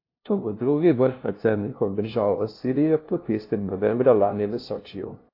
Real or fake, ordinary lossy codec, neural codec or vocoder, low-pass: fake; AAC, 32 kbps; codec, 16 kHz, 0.5 kbps, FunCodec, trained on LibriTTS, 25 frames a second; 5.4 kHz